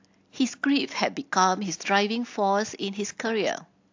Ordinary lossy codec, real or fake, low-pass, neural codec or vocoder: AAC, 48 kbps; real; 7.2 kHz; none